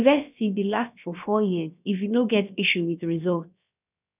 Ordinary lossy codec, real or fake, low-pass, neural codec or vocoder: none; fake; 3.6 kHz; codec, 16 kHz, about 1 kbps, DyCAST, with the encoder's durations